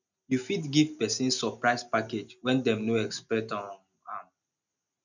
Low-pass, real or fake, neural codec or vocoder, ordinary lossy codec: 7.2 kHz; real; none; none